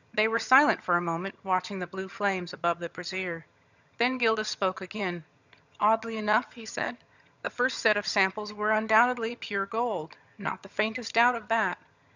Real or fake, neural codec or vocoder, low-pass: fake; vocoder, 22.05 kHz, 80 mel bands, HiFi-GAN; 7.2 kHz